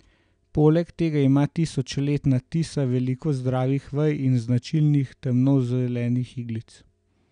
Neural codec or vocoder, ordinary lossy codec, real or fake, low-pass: none; none; real; 9.9 kHz